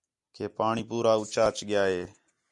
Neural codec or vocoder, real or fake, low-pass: none; real; 10.8 kHz